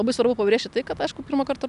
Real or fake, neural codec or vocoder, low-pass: real; none; 10.8 kHz